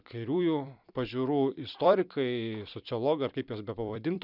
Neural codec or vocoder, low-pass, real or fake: vocoder, 44.1 kHz, 128 mel bands every 256 samples, BigVGAN v2; 5.4 kHz; fake